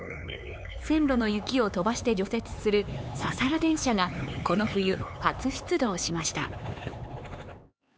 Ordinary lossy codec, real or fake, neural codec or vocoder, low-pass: none; fake; codec, 16 kHz, 4 kbps, X-Codec, HuBERT features, trained on LibriSpeech; none